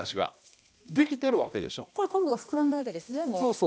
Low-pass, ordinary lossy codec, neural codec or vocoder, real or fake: none; none; codec, 16 kHz, 1 kbps, X-Codec, HuBERT features, trained on balanced general audio; fake